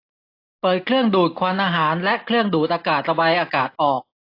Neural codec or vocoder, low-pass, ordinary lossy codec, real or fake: none; 5.4 kHz; AAC, 32 kbps; real